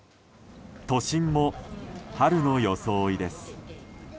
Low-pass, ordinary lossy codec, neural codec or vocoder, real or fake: none; none; none; real